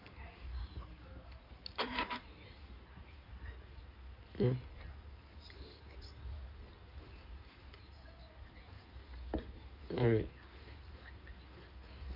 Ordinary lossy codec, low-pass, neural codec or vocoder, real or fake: none; 5.4 kHz; codec, 16 kHz in and 24 kHz out, 2.2 kbps, FireRedTTS-2 codec; fake